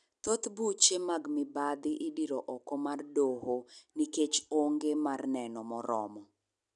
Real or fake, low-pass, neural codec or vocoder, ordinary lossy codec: real; 10.8 kHz; none; none